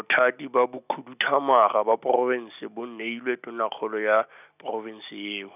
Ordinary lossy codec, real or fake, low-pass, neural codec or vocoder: none; real; 3.6 kHz; none